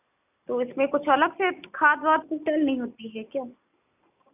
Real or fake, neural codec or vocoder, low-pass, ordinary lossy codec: real; none; 3.6 kHz; none